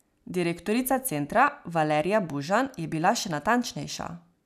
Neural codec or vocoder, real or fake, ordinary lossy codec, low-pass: none; real; none; 14.4 kHz